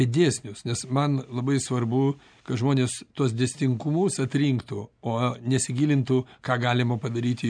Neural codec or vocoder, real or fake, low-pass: none; real; 9.9 kHz